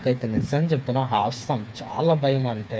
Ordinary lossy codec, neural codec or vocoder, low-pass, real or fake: none; codec, 16 kHz, 4 kbps, FreqCodec, smaller model; none; fake